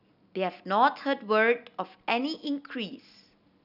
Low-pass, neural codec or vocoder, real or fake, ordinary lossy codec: 5.4 kHz; none; real; none